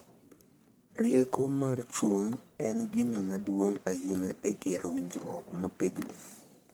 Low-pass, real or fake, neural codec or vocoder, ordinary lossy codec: none; fake; codec, 44.1 kHz, 1.7 kbps, Pupu-Codec; none